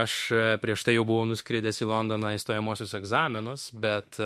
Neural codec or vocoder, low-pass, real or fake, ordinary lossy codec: autoencoder, 48 kHz, 32 numbers a frame, DAC-VAE, trained on Japanese speech; 14.4 kHz; fake; MP3, 64 kbps